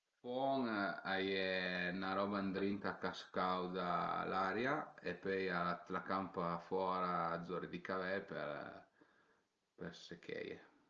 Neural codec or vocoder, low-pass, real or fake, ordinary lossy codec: none; 7.2 kHz; real; Opus, 24 kbps